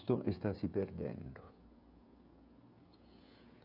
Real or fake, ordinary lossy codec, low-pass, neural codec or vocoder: fake; none; 5.4 kHz; codec, 16 kHz, 16 kbps, FreqCodec, smaller model